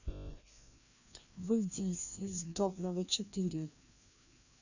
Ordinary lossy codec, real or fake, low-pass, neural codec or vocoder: none; fake; 7.2 kHz; codec, 16 kHz, 1 kbps, FreqCodec, larger model